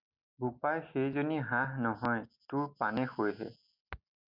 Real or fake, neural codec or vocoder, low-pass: real; none; 5.4 kHz